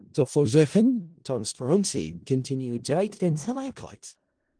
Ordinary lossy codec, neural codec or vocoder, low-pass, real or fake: Opus, 24 kbps; codec, 16 kHz in and 24 kHz out, 0.4 kbps, LongCat-Audio-Codec, four codebook decoder; 9.9 kHz; fake